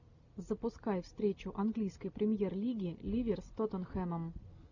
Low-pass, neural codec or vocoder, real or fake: 7.2 kHz; none; real